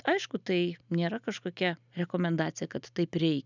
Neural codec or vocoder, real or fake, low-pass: none; real; 7.2 kHz